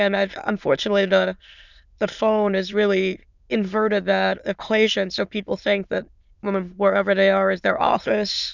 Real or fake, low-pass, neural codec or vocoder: fake; 7.2 kHz; autoencoder, 22.05 kHz, a latent of 192 numbers a frame, VITS, trained on many speakers